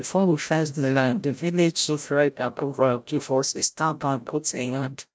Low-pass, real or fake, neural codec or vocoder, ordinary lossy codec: none; fake; codec, 16 kHz, 0.5 kbps, FreqCodec, larger model; none